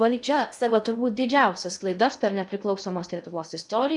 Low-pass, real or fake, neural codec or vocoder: 10.8 kHz; fake; codec, 16 kHz in and 24 kHz out, 0.6 kbps, FocalCodec, streaming, 2048 codes